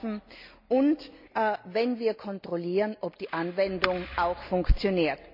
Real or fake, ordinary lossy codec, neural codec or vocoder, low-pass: real; MP3, 48 kbps; none; 5.4 kHz